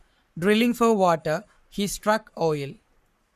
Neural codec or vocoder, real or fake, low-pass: codec, 44.1 kHz, 7.8 kbps, Pupu-Codec; fake; 14.4 kHz